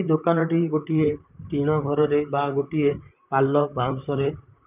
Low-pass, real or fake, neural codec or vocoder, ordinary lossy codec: 3.6 kHz; fake; vocoder, 44.1 kHz, 128 mel bands, Pupu-Vocoder; none